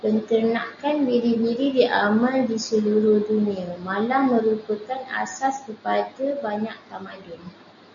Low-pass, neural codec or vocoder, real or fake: 7.2 kHz; none; real